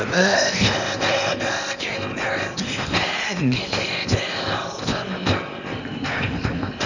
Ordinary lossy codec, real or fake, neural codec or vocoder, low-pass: none; fake; codec, 16 kHz in and 24 kHz out, 0.8 kbps, FocalCodec, streaming, 65536 codes; 7.2 kHz